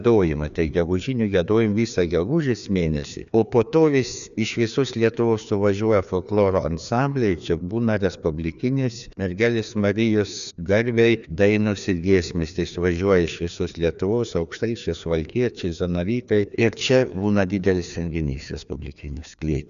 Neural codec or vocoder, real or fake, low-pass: codec, 16 kHz, 2 kbps, FreqCodec, larger model; fake; 7.2 kHz